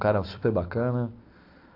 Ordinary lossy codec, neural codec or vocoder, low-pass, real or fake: AAC, 32 kbps; none; 5.4 kHz; real